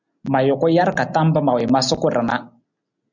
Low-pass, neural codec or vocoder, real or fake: 7.2 kHz; none; real